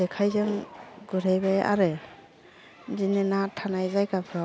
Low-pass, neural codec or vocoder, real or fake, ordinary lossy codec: none; none; real; none